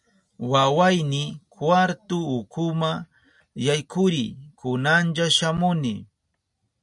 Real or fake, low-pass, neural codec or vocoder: real; 10.8 kHz; none